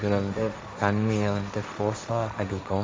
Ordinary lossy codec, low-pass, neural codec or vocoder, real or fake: none; none; codec, 16 kHz, 1.1 kbps, Voila-Tokenizer; fake